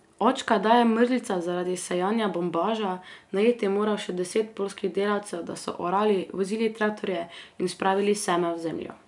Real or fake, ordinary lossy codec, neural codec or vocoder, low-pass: real; none; none; 10.8 kHz